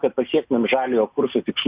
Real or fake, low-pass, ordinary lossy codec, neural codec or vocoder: real; 3.6 kHz; Opus, 64 kbps; none